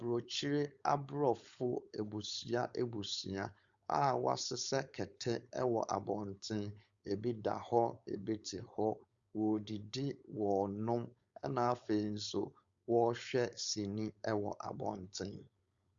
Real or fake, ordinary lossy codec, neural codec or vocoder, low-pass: fake; Opus, 64 kbps; codec, 16 kHz, 4.8 kbps, FACodec; 7.2 kHz